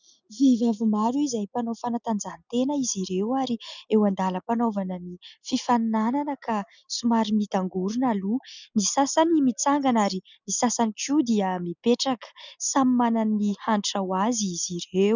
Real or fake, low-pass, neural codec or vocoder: real; 7.2 kHz; none